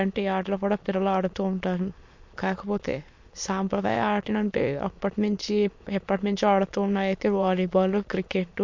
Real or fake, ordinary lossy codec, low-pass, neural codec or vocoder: fake; MP3, 48 kbps; 7.2 kHz; autoencoder, 22.05 kHz, a latent of 192 numbers a frame, VITS, trained on many speakers